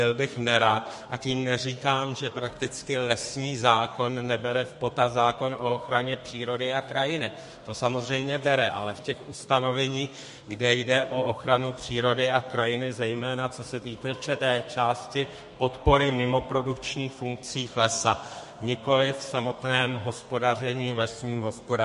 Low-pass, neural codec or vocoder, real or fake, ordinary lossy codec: 14.4 kHz; codec, 32 kHz, 1.9 kbps, SNAC; fake; MP3, 48 kbps